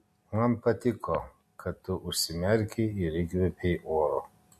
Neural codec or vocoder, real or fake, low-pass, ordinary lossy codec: none; real; 14.4 kHz; MP3, 64 kbps